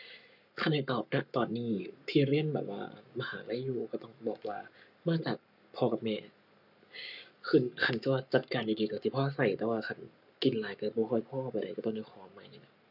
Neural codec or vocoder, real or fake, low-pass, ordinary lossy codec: codec, 44.1 kHz, 7.8 kbps, Pupu-Codec; fake; 5.4 kHz; none